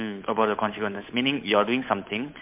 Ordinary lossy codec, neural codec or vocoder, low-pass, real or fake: MP3, 32 kbps; codec, 16 kHz, 8 kbps, FunCodec, trained on Chinese and English, 25 frames a second; 3.6 kHz; fake